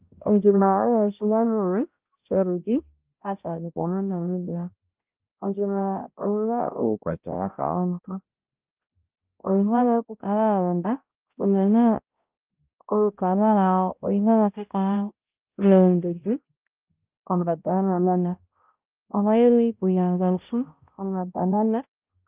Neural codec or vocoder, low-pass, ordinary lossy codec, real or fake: codec, 16 kHz, 0.5 kbps, X-Codec, HuBERT features, trained on balanced general audio; 3.6 kHz; Opus, 24 kbps; fake